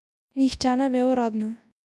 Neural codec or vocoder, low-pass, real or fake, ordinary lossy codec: codec, 24 kHz, 0.9 kbps, WavTokenizer, large speech release; none; fake; none